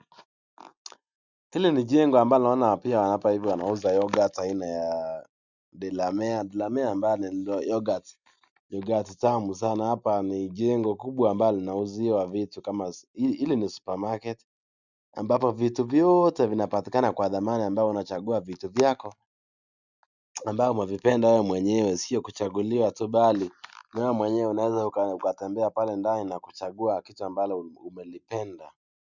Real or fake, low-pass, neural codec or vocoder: real; 7.2 kHz; none